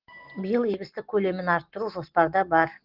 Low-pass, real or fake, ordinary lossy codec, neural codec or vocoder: 5.4 kHz; real; Opus, 16 kbps; none